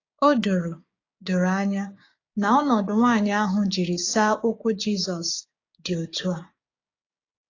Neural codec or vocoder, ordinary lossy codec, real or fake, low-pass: codec, 16 kHz, 6 kbps, DAC; AAC, 32 kbps; fake; 7.2 kHz